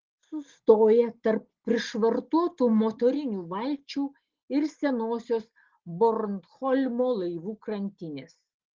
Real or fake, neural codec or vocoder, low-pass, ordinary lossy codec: real; none; 7.2 kHz; Opus, 16 kbps